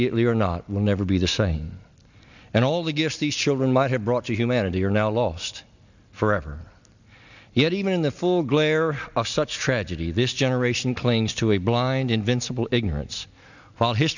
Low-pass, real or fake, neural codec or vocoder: 7.2 kHz; real; none